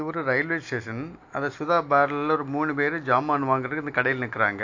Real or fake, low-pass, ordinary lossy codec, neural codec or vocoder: real; 7.2 kHz; AAC, 48 kbps; none